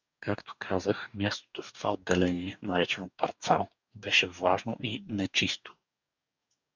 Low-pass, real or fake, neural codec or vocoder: 7.2 kHz; fake; codec, 44.1 kHz, 2.6 kbps, DAC